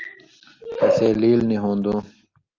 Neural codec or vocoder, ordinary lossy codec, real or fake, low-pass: none; Opus, 32 kbps; real; 7.2 kHz